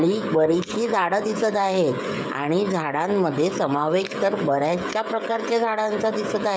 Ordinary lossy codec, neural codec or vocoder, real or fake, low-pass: none; codec, 16 kHz, 16 kbps, FreqCodec, smaller model; fake; none